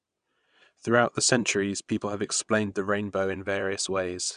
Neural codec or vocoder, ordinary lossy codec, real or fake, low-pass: vocoder, 22.05 kHz, 80 mel bands, WaveNeXt; none; fake; 9.9 kHz